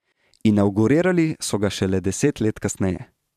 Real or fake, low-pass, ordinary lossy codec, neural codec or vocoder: fake; 14.4 kHz; none; vocoder, 44.1 kHz, 128 mel bands every 512 samples, BigVGAN v2